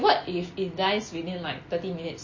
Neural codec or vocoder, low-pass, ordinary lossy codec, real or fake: none; 7.2 kHz; MP3, 32 kbps; real